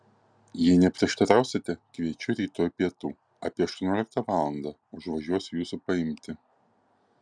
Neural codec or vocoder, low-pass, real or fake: none; 9.9 kHz; real